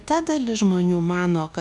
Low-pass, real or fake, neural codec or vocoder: 10.8 kHz; fake; codec, 24 kHz, 1.2 kbps, DualCodec